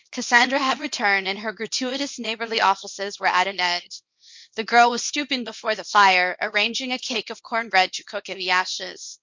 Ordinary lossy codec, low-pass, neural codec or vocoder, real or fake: MP3, 48 kbps; 7.2 kHz; codec, 24 kHz, 0.9 kbps, WavTokenizer, small release; fake